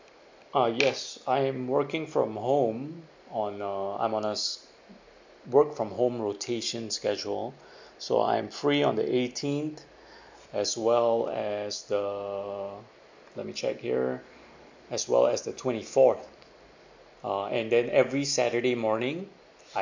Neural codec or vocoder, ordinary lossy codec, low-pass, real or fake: none; MP3, 48 kbps; 7.2 kHz; real